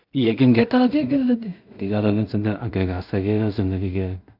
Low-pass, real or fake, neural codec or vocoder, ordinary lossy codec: 5.4 kHz; fake; codec, 16 kHz in and 24 kHz out, 0.4 kbps, LongCat-Audio-Codec, two codebook decoder; none